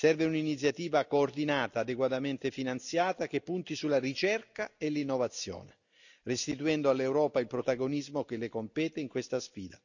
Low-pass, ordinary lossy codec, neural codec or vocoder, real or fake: 7.2 kHz; none; none; real